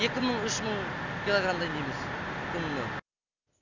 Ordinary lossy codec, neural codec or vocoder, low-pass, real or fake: none; none; 7.2 kHz; real